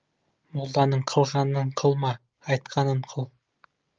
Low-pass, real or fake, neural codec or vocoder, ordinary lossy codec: 7.2 kHz; real; none; Opus, 24 kbps